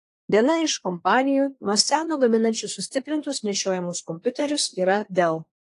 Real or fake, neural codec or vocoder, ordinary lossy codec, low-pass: fake; codec, 24 kHz, 1 kbps, SNAC; AAC, 48 kbps; 10.8 kHz